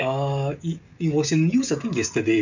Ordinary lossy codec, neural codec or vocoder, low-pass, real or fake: none; codec, 16 kHz, 16 kbps, FreqCodec, smaller model; 7.2 kHz; fake